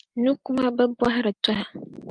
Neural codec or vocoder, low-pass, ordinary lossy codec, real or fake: none; 9.9 kHz; Opus, 32 kbps; real